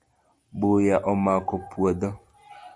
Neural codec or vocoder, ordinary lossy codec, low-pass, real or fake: none; Opus, 64 kbps; 9.9 kHz; real